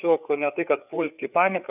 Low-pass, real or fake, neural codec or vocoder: 3.6 kHz; fake; codec, 16 kHz, 4 kbps, FreqCodec, larger model